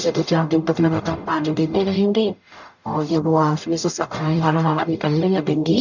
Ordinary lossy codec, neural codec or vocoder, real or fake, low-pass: none; codec, 44.1 kHz, 0.9 kbps, DAC; fake; 7.2 kHz